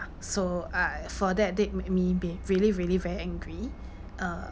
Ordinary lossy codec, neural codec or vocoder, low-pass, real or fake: none; none; none; real